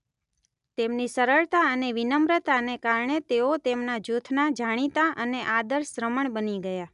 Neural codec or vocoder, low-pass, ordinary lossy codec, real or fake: none; 10.8 kHz; none; real